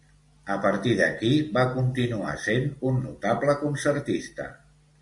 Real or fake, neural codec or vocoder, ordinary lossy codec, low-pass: real; none; MP3, 96 kbps; 10.8 kHz